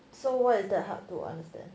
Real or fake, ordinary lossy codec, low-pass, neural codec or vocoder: real; none; none; none